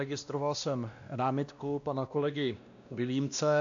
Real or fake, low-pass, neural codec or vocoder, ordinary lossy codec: fake; 7.2 kHz; codec, 16 kHz, 1 kbps, X-Codec, WavLM features, trained on Multilingual LibriSpeech; AAC, 64 kbps